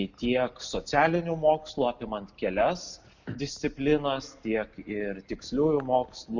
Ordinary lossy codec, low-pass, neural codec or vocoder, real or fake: Opus, 64 kbps; 7.2 kHz; none; real